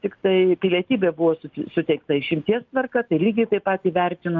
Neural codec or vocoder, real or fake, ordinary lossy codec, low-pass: none; real; Opus, 32 kbps; 7.2 kHz